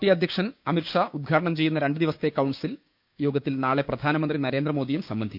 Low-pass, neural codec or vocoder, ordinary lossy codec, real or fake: 5.4 kHz; codec, 16 kHz, 6 kbps, DAC; none; fake